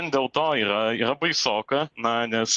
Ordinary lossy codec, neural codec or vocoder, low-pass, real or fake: MP3, 64 kbps; none; 10.8 kHz; real